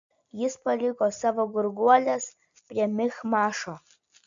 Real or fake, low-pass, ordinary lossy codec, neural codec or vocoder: real; 7.2 kHz; MP3, 64 kbps; none